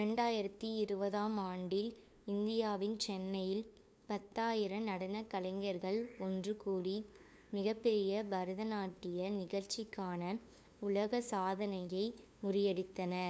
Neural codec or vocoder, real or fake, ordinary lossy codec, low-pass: codec, 16 kHz, 2 kbps, FunCodec, trained on LibriTTS, 25 frames a second; fake; none; none